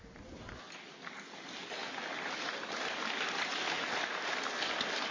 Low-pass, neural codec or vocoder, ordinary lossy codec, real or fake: 7.2 kHz; none; MP3, 32 kbps; real